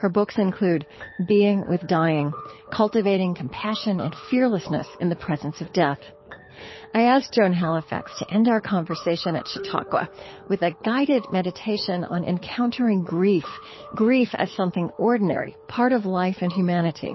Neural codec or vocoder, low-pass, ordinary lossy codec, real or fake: codec, 24 kHz, 6 kbps, HILCodec; 7.2 kHz; MP3, 24 kbps; fake